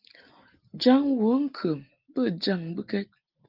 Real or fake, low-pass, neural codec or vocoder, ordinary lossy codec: real; 5.4 kHz; none; Opus, 32 kbps